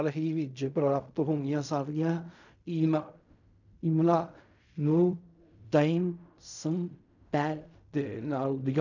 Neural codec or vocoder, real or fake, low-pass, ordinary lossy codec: codec, 16 kHz in and 24 kHz out, 0.4 kbps, LongCat-Audio-Codec, fine tuned four codebook decoder; fake; 7.2 kHz; none